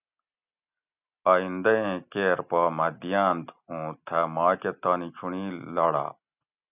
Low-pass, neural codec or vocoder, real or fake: 3.6 kHz; none; real